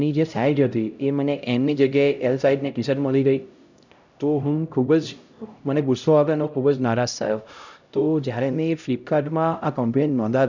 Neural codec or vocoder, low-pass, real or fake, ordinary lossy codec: codec, 16 kHz, 0.5 kbps, X-Codec, HuBERT features, trained on LibriSpeech; 7.2 kHz; fake; none